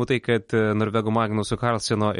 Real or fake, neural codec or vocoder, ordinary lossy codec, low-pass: real; none; MP3, 48 kbps; 19.8 kHz